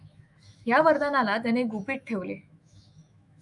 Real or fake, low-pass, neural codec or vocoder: fake; 10.8 kHz; autoencoder, 48 kHz, 128 numbers a frame, DAC-VAE, trained on Japanese speech